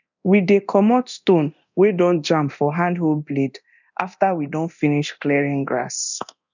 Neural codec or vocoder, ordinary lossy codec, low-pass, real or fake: codec, 24 kHz, 0.9 kbps, DualCodec; none; 7.2 kHz; fake